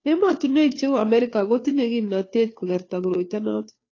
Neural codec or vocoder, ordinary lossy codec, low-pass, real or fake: codec, 16 kHz, 2 kbps, FunCodec, trained on Chinese and English, 25 frames a second; AAC, 32 kbps; 7.2 kHz; fake